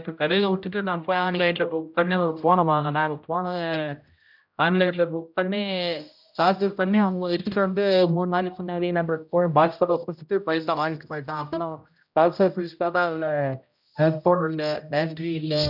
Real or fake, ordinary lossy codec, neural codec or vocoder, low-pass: fake; none; codec, 16 kHz, 0.5 kbps, X-Codec, HuBERT features, trained on general audio; 5.4 kHz